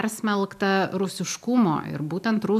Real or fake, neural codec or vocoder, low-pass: real; none; 14.4 kHz